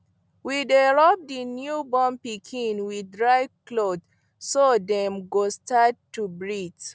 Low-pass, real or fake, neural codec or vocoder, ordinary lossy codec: none; real; none; none